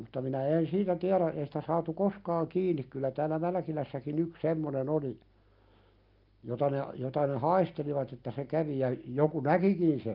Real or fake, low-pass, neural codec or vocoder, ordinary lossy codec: real; 5.4 kHz; none; Opus, 24 kbps